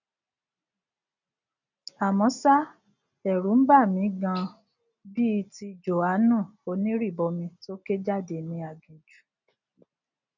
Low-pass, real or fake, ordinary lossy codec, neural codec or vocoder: 7.2 kHz; real; none; none